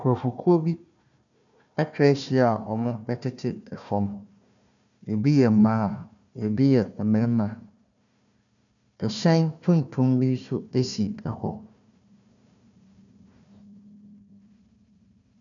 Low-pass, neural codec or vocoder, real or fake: 7.2 kHz; codec, 16 kHz, 1 kbps, FunCodec, trained on Chinese and English, 50 frames a second; fake